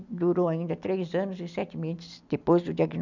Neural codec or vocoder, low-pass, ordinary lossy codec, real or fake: none; 7.2 kHz; none; real